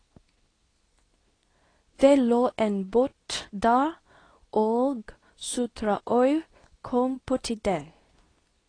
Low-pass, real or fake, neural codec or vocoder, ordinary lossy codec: 9.9 kHz; fake; codec, 24 kHz, 0.9 kbps, WavTokenizer, medium speech release version 2; AAC, 32 kbps